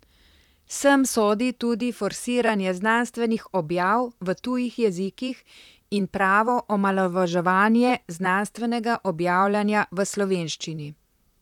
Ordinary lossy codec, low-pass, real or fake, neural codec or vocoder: none; 19.8 kHz; fake; vocoder, 44.1 kHz, 128 mel bands, Pupu-Vocoder